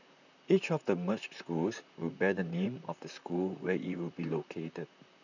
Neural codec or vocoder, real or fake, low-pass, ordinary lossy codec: vocoder, 44.1 kHz, 128 mel bands, Pupu-Vocoder; fake; 7.2 kHz; none